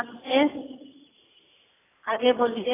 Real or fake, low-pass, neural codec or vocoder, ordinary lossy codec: real; 3.6 kHz; none; AAC, 16 kbps